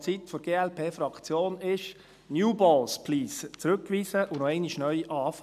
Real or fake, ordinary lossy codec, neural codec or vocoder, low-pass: real; none; none; 14.4 kHz